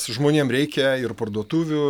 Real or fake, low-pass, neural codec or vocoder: real; 19.8 kHz; none